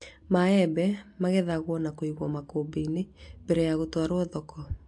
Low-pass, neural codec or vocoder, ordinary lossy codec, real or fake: 10.8 kHz; none; AAC, 64 kbps; real